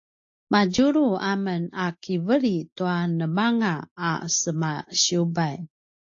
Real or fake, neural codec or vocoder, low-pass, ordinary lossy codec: real; none; 7.2 kHz; AAC, 48 kbps